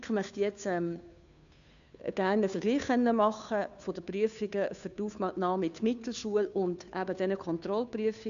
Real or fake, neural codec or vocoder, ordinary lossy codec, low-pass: fake; codec, 16 kHz, 2 kbps, FunCodec, trained on Chinese and English, 25 frames a second; none; 7.2 kHz